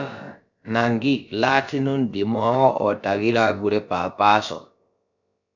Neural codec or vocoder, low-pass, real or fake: codec, 16 kHz, about 1 kbps, DyCAST, with the encoder's durations; 7.2 kHz; fake